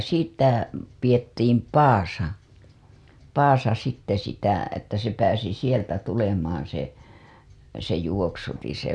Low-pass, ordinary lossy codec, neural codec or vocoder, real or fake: none; none; none; real